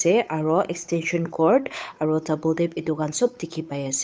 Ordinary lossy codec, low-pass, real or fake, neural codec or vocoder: none; none; fake; codec, 16 kHz, 8 kbps, FunCodec, trained on Chinese and English, 25 frames a second